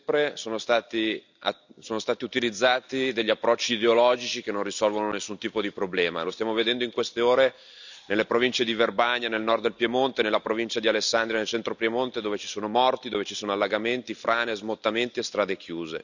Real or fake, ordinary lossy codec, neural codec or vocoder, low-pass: real; none; none; 7.2 kHz